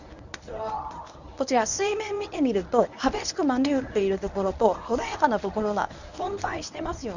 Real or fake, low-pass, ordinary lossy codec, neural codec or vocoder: fake; 7.2 kHz; none; codec, 24 kHz, 0.9 kbps, WavTokenizer, medium speech release version 1